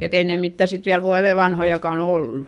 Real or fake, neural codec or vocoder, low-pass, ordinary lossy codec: fake; codec, 24 kHz, 3 kbps, HILCodec; 10.8 kHz; none